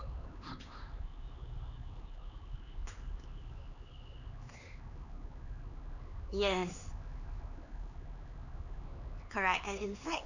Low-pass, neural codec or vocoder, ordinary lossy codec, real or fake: 7.2 kHz; codec, 16 kHz, 4 kbps, X-Codec, HuBERT features, trained on LibriSpeech; none; fake